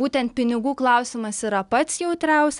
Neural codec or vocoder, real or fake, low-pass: none; real; 10.8 kHz